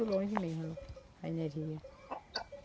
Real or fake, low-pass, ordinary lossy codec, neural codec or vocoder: real; none; none; none